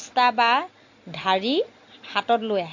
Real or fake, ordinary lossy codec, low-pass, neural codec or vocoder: real; AAC, 48 kbps; 7.2 kHz; none